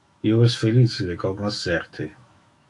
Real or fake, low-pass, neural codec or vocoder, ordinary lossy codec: fake; 10.8 kHz; autoencoder, 48 kHz, 128 numbers a frame, DAC-VAE, trained on Japanese speech; AAC, 64 kbps